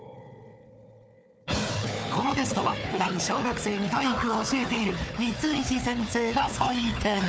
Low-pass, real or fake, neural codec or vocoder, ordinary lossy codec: none; fake; codec, 16 kHz, 4 kbps, FunCodec, trained on Chinese and English, 50 frames a second; none